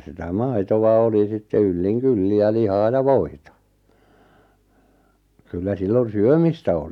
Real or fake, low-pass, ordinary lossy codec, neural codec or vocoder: real; 19.8 kHz; none; none